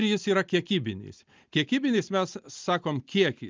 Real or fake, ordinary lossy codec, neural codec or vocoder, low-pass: real; Opus, 24 kbps; none; 7.2 kHz